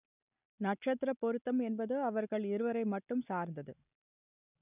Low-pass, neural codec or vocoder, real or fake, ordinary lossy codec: 3.6 kHz; none; real; none